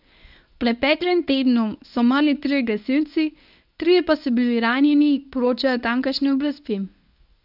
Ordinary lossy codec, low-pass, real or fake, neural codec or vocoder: none; 5.4 kHz; fake; codec, 24 kHz, 0.9 kbps, WavTokenizer, medium speech release version 1